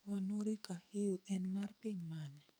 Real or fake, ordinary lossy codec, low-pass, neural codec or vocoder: fake; none; none; codec, 44.1 kHz, 2.6 kbps, SNAC